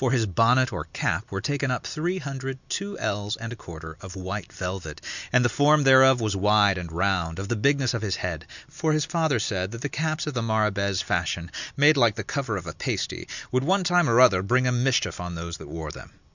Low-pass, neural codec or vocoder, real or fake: 7.2 kHz; none; real